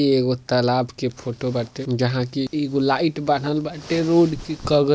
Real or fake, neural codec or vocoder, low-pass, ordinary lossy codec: real; none; none; none